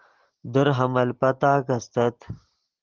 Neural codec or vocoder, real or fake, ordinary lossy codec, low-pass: none; real; Opus, 16 kbps; 7.2 kHz